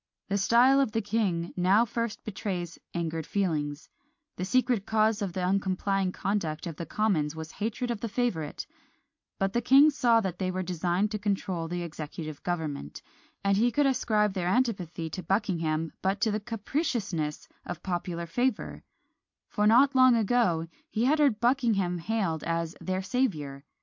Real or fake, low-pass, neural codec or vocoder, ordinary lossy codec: real; 7.2 kHz; none; MP3, 48 kbps